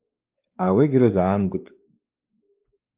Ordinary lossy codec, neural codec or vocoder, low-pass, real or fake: Opus, 24 kbps; codec, 16 kHz, 2 kbps, X-Codec, WavLM features, trained on Multilingual LibriSpeech; 3.6 kHz; fake